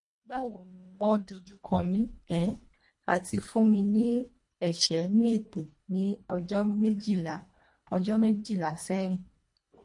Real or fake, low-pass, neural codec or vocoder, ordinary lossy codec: fake; 10.8 kHz; codec, 24 kHz, 1.5 kbps, HILCodec; MP3, 48 kbps